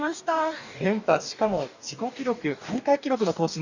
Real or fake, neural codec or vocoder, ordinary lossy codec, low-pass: fake; codec, 44.1 kHz, 2.6 kbps, DAC; none; 7.2 kHz